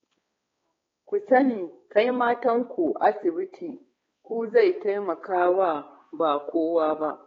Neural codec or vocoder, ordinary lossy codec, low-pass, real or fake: codec, 16 kHz, 4 kbps, X-Codec, HuBERT features, trained on balanced general audio; AAC, 24 kbps; 7.2 kHz; fake